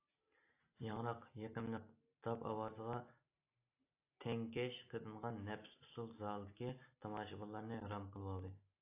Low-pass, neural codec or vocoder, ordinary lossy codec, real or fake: 3.6 kHz; none; AAC, 24 kbps; real